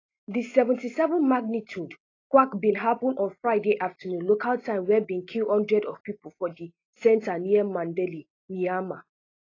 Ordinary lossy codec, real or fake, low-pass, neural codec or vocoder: AAC, 32 kbps; real; 7.2 kHz; none